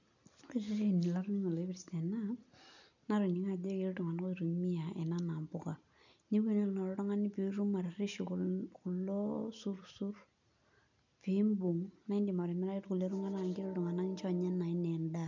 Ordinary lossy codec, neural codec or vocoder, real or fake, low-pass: none; none; real; 7.2 kHz